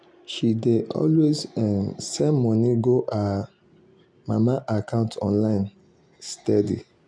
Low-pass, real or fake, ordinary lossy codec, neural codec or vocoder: 9.9 kHz; real; none; none